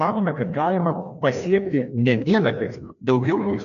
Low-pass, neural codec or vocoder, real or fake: 7.2 kHz; codec, 16 kHz, 1 kbps, FreqCodec, larger model; fake